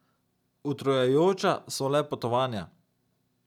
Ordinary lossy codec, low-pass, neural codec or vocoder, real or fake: none; 19.8 kHz; none; real